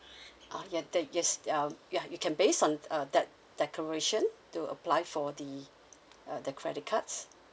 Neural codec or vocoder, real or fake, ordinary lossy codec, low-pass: none; real; none; none